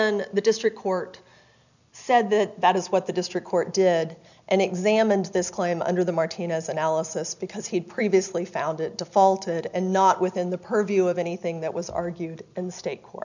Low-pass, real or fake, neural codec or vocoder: 7.2 kHz; real; none